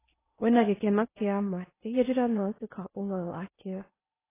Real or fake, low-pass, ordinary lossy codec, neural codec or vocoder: fake; 3.6 kHz; AAC, 16 kbps; codec, 16 kHz in and 24 kHz out, 0.8 kbps, FocalCodec, streaming, 65536 codes